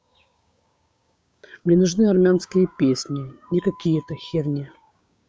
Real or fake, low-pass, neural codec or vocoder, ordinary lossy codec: fake; none; codec, 16 kHz, 6 kbps, DAC; none